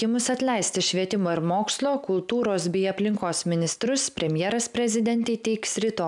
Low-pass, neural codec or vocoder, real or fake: 10.8 kHz; none; real